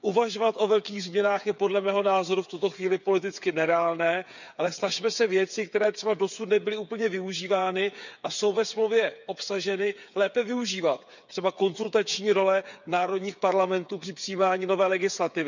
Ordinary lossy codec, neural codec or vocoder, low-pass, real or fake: none; codec, 16 kHz, 8 kbps, FreqCodec, smaller model; 7.2 kHz; fake